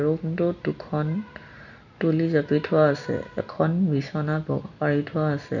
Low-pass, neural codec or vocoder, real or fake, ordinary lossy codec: 7.2 kHz; none; real; none